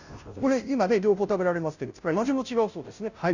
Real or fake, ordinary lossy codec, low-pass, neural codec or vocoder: fake; none; 7.2 kHz; codec, 16 kHz, 0.5 kbps, FunCodec, trained on Chinese and English, 25 frames a second